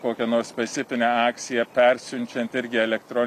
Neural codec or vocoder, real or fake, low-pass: none; real; 14.4 kHz